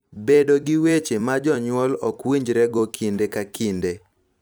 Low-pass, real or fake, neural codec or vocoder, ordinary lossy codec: none; fake; vocoder, 44.1 kHz, 128 mel bands every 256 samples, BigVGAN v2; none